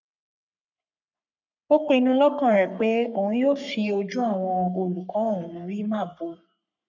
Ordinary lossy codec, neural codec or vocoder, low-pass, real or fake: none; codec, 44.1 kHz, 3.4 kbps, Pupu-Codec; 7.2 kHz; fake